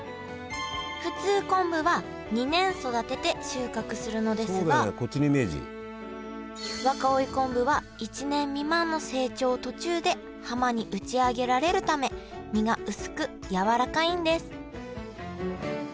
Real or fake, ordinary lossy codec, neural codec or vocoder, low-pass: real; none; none; none